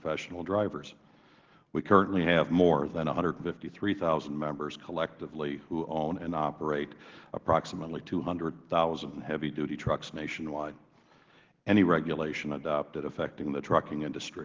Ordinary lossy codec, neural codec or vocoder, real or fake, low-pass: Opus, 16 kbps; none; real; 7.2 kHz